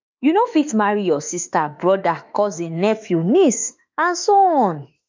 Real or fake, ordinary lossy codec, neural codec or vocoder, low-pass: fake; MP3, 64 kbps; autoencoder, 48 kHz, 32 numbers a frame, DAC-VAE, trained on Japanese speech; 7.2 kHz